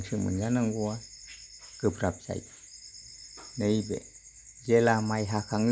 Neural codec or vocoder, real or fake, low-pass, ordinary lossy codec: none; real; none; none